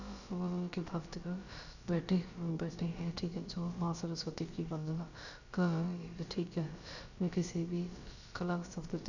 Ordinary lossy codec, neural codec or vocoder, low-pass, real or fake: none; codec, 16 kHz, about 1 kbps, DyCAST, with the encoder's durations; 7.2 kHz; fake